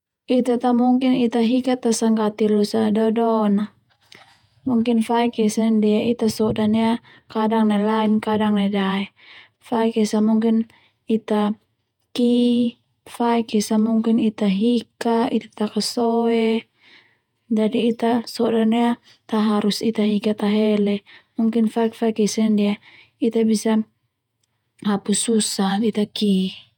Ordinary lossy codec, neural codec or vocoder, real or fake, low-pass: none; vocoder, 48 kHz, 128 mel bands, Vocos; fake; 19.8 kHz